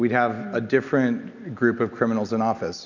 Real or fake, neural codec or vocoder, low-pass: real; none; 7.2 kHz